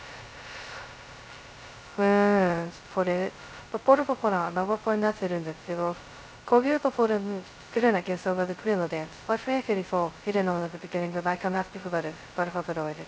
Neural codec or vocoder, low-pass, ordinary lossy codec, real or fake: codec, 16 kHz, 0.2 kbps, FocalCodec; none; none; fake